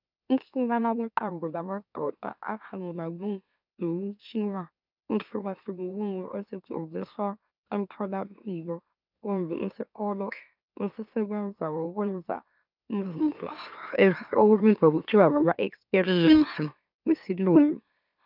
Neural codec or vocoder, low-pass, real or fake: autoencoder, 44.1 kHz, a latent of 192 numbers a frame, MeloTTS; 5.4 kHz; fake